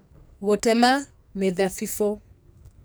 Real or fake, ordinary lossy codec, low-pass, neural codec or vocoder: fake; none; none; codec, 44.1 kHz, 2.6 kbps, SNAC